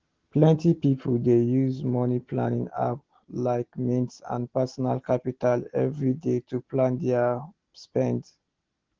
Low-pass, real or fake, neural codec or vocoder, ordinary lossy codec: 7.2 kHz; real; none; Opus, 16 kbps